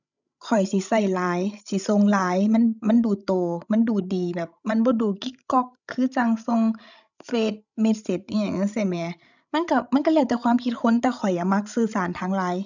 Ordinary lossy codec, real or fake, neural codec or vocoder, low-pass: none; fake; codec, 16 kHz, 16 kbps, FreqCodec, larger model; 7.2 kHz